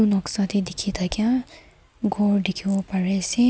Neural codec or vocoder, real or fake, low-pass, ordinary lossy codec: none; real; none; none